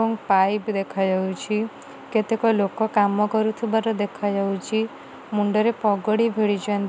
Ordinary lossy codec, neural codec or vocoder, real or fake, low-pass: none; none; real; none